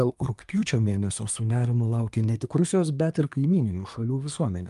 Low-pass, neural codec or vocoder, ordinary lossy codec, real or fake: 10.8 kHz; codec, 24 kHz, 1 kbps, SNAC; Opus, 32 kbps; fake